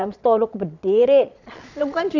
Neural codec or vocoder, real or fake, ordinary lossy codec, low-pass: vocoder, 22.05 kHz, 80 mel bands, Vocos; fake; none; 7.2 kHz